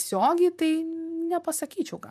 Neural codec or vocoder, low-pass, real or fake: vocoder, 44.1 kHz, 128 mel bands, Pupu-Vocoder; 14.4 kHz; fake